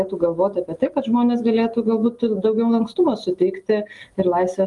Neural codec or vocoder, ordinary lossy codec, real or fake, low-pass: none; Opus, 32 kbps; real; 10.8 kHz